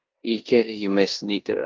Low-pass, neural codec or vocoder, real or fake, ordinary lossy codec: 7.2 kHz; codec, 16 kHz in and 24 kHz out, 0.9 kbps, LongCat-Audio-Codec, four codebook decoder; fake; Opus, 32 kbps